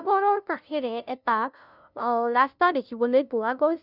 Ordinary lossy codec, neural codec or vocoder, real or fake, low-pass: none; codec, 16 kHz, 0.5 kbps, FunCodec, trained on LibriTTS, 25 frames a second; fake; 5.4 kHz